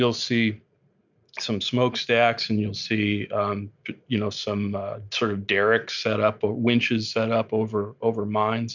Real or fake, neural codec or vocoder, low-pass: real; none; 7.2 kHz